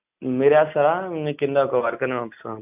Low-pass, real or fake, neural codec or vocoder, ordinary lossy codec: 3.6 kHz; real; none; AAC, 32 kbps